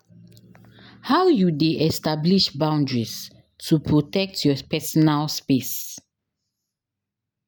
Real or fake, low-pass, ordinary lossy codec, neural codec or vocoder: real; none; none; none